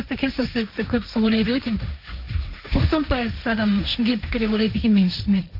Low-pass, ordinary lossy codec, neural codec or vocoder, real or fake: 5.4 kHz; none; codec, 16 kHz, 1.1 kbps, Voila-Tokenizer; fake